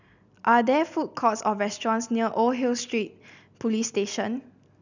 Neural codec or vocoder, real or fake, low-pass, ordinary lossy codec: none; real; 7.2 kHz; none